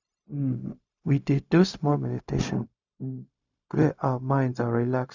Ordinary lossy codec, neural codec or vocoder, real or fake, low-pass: none; codec, 16 kHz, 0.4 kbps, LongCat-Audio-Codec; fake; 7.2 kHz